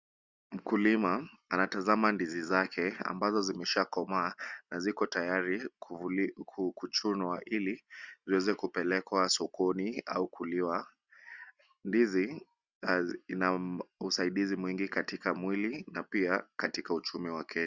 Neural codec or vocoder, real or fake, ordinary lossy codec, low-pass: none; real; Opus, 64 kbps; 7.2 kHz